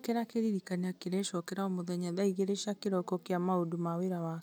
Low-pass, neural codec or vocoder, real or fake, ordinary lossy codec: none; none; real; none